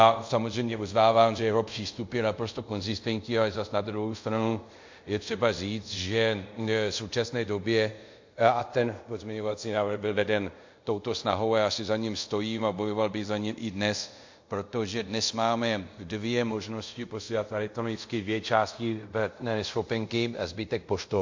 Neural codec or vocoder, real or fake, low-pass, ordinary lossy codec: codec, 24 kHz, 0.5 kbps, DualCodec; fake; 7.2 kHz; MP3, 48 kbps